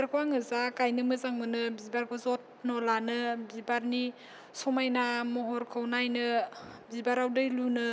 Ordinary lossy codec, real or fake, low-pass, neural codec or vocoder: none; real; none; none